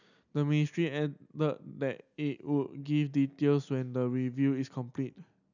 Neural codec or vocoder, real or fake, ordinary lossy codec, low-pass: none; real; none; 7.2 kHz